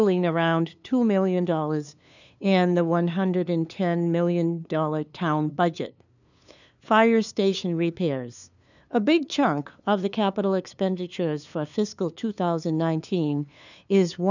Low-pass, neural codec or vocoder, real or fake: 7.2 kHz; codec, 16 kHz, 2 kbps, FunCodec, trained on LibriTTS, 25 frames a second; fake